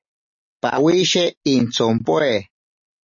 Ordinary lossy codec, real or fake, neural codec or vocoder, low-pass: MP3, 32 kbps; real; none; 7.2 kHz